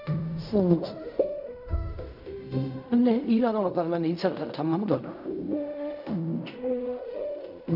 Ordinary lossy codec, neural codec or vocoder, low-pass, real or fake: none; codec, 16 kHz in and 24 kHz out, 0.4 kbps, LongCat-Audio-Codec, fine tuned four codebook decoder; 5.4 kHz; fake